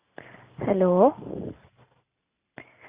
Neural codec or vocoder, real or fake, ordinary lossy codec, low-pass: none; real; Opus, 64 kbps; 3.6 kHz